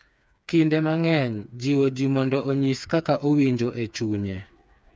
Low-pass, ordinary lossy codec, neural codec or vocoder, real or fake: none; none; codec, 16 kHz, 4 kbps, FreqCodec, smaller model; fake